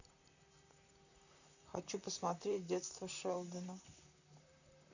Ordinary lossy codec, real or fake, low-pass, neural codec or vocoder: none; fake; 7.2 kHz; vocoder, 44.1 kHz, 128 mel bands, Pupu-Vocoder